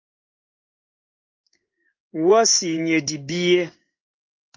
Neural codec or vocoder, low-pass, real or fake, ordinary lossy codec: codec, 16 kHz in and 24 kHz out, 1 kbps, XY-Tokenizer; 7.2 kHz; fake; Opus, 32 kbps